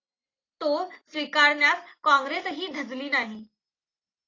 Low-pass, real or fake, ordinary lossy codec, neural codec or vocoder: 7.2 kHz; real; AAC, 32 kbps; none